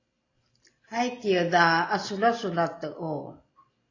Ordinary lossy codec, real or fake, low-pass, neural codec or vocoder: AAC, 32 kbps; real; 7.2 kHz; none